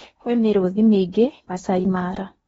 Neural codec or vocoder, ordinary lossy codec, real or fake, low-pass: codec, 16 kHz in and 24 kHz out, 0.8 kbps, FocalCodec, streaming, 65536 codes; AAC, 24 kbps; fake; 10.8 kHz